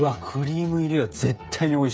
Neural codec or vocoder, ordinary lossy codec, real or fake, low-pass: codec, 16 kHz, 8 kbps, FreqCodec, smaller model; none; fake; none